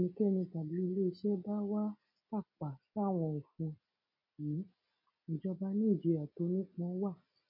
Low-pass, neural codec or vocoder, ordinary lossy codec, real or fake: 5.4 kHz; none; none; real